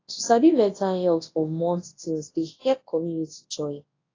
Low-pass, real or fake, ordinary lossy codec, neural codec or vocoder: 7.2 kHz; fake; AAC, 32 kbps; codec, 24 kHz, 0.9 kbps, WavTokenizer, large speech release